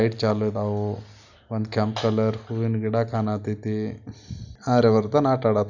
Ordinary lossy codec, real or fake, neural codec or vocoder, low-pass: none; real; none; 7.2 kHz